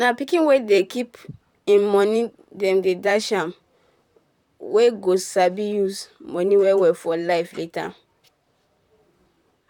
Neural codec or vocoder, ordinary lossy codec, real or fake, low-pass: vocoder, 44.1 kHz, 128 mel bands, Pupu-Vocoder; none; fake; 19.8 kHz